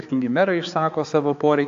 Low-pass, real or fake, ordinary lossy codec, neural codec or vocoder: 7.2 kHz; fake; AAC, 64 kbps; codec, 16 kHz, 4 kbps, X-Codec, HuBERT features, trained on balanced general audio